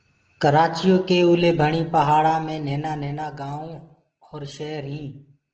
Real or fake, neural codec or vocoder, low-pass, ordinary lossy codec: real; none; 7.2 kHz; Opus, 16 kbps